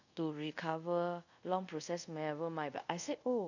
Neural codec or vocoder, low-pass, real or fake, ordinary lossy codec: codec, 24 kHz, 0.5 kbps, DualCodec; 7.2 kHz; fake; none